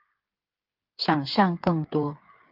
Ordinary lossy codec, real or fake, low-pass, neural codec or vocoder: Opus, 32 kbps; fake; 5.4 kHz; codec, 16 kHz, 8 kbps, FreqCodec, smaller model